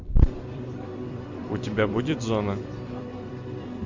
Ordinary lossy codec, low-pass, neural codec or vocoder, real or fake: MP3, 48 kbps; 7.2 kHz; vocoder, 44.1 kHz, 128 mel bands every 256 samples, BigVGAN v2; fake